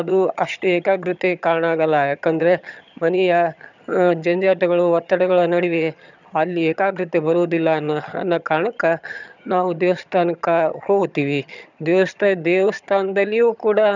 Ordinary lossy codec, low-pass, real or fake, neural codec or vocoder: none; 7.2 kHz; fake; vocoder, 22.05 kHz, 80 mel bands, HiFi-GAN